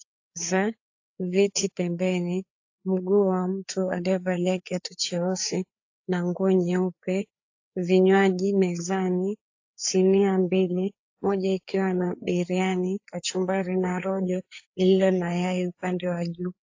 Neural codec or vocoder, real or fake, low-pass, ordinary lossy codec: codec, 16 kHz, 4 kbps, FreqCodec, larger model; fake; 7.2 kHz; AAC, 48 kbps